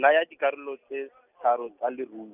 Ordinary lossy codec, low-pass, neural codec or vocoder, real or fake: none; 3.6 kHz; none; real